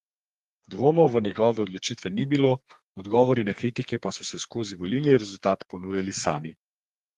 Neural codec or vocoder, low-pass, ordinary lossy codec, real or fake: codec, 32 kHz, 1.9 kbps, SNAC; 14.4 kHz; Opus, 24 kbps; fake